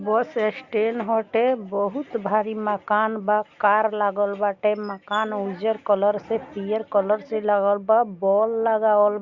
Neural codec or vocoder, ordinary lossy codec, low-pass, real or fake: none; Opus, 64 kbps; 7.2 kHz; real